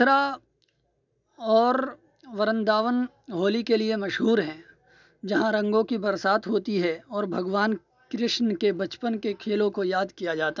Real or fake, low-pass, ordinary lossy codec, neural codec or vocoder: real; 7.2 kHz; none; none